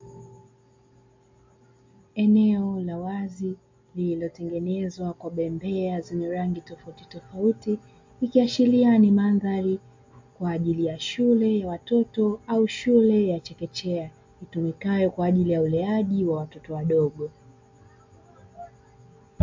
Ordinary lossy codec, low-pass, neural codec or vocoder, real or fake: MP3, 48 kbps; 7.2 kHz; none; real